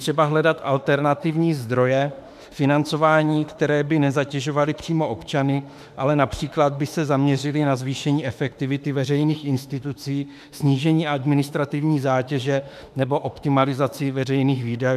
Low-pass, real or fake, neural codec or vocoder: 14.4 kHz; fake; autoencoder, 48 kHz, 32 numbers a frame, DAC-VAE, trained on Japanese speech